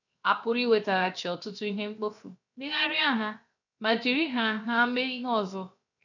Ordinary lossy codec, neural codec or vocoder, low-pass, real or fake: none; codec, 16 kHz, 0.7 kbps, FocalCodec; 7.2 kHz; fake